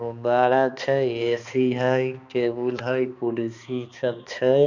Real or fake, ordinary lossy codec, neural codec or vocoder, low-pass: fake; none; codec, 16 kHz, 2 kbps, X-Codec, HuBERT features, trained on balanced general audio; 7.2 kHz